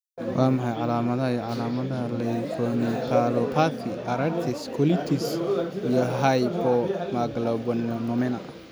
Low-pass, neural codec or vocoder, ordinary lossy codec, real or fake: none; none; none; real